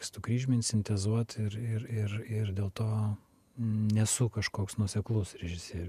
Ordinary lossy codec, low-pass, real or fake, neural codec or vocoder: MP3, 96 kbps; 14.4 kHz; fake; vocoder, 48 kHz, 128 mel bands, Vocos